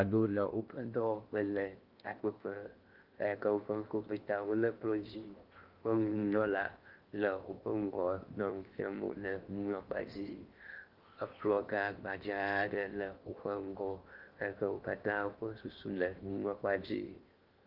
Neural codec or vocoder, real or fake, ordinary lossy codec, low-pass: codec, 16 kHz in and 24 kHz out, 0.8 kbps, FocalCodec, streaming, 65536 codes; fake; Opus, 24 kbps; 5.4 kHz